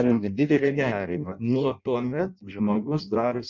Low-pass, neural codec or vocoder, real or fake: 7.2 kHz; codec, 16 kHz in and 24 kHz out, 0.6 kbps, FireRedTTS-2 codec; fake